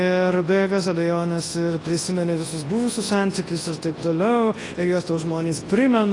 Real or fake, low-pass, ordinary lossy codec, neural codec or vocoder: fake; 10.8 kHz; AAC, 32 kbps; codec, 24 kHz, 0.9 kbps, WavTokenizer, large speech release